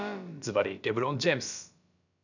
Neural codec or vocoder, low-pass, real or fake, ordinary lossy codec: codec, 16 kHz, about 1 kbps, DyCAST, with the encoder's durations; 7.2 kHz; fake; none